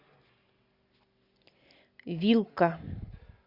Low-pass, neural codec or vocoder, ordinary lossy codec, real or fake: 5.4 kHz; none; Opus, 64 kbps; real